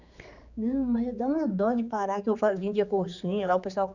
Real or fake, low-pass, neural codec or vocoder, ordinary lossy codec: fake; 7.2 kHz; codec, 16 kHz, 4 kbps, X-Codec, HuBERT features, trained on general audio; none